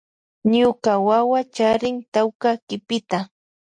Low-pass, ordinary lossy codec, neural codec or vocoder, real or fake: 9.9 kHz; MP3, 48 kbps; none; real